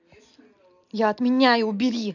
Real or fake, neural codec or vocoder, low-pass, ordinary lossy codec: real; none; 7.2 kHz; none